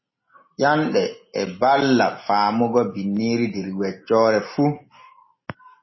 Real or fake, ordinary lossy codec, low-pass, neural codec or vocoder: real; MP3, 24 kbps; 7.2 kHz; none